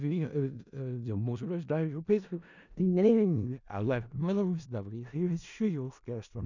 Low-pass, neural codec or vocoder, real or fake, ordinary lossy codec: 7.2 kHz; codec, 16 kHz in and 24 kHz out, 0.4 kbps, LongCat-Audio-Codec, four codebook decoder; fake; none